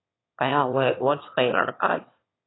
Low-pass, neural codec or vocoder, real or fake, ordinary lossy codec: 7.2 kHz; autoencoder, 22.05 kHz, a latent of 192 numbers a frame, VITS, trained on one speaker; fake; AAC, 16 kbps